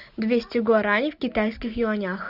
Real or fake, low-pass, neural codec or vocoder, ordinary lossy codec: real; 5.4 kHz; none; Opus, 64 kbps